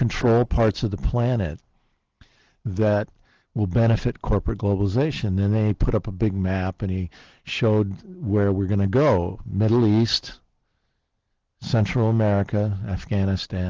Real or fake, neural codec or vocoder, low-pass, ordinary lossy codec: real; none; 7.2 kHz; Opus, 16 kbps